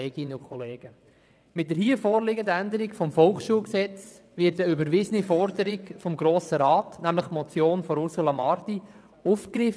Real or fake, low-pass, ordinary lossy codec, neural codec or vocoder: fake; none; none; vocoder, 22.05 kHz, 80 mel bands, WaveNeXt